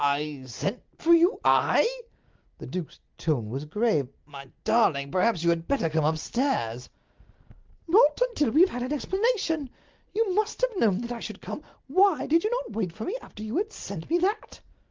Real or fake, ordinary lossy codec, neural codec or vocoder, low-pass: real; Opus, 32 kbps; none; 7.2 kHz